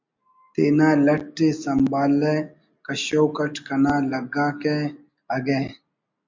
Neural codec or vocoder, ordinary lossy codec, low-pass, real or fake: none; MP3, 64 kbps; 7.2 kHz; real